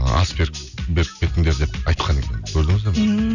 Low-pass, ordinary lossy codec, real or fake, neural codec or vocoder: 7.2 kHz; none; real; none